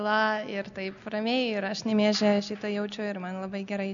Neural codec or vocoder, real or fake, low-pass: none; real; 7.2 kHz